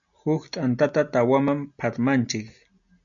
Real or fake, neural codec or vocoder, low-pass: real; none; 7.2 kHz